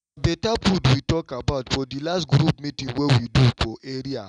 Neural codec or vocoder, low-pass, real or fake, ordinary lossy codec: none; 9.9 kHz; real; none